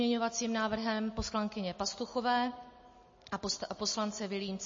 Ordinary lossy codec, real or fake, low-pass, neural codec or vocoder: MP3, 32 kbps; real; 7.2 kHz; none